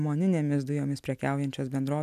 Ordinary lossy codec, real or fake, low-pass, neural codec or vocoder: AAC, 96 kbps; real; 14.4 kHz; none